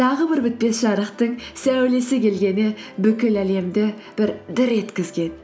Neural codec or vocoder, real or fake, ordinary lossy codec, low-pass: none; real; none; none